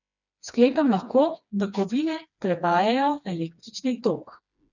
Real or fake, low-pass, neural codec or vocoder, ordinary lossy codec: fake; 7.2 kHz; codec, 16 kHz, 2 kbps, FreqCodec, smaller model; none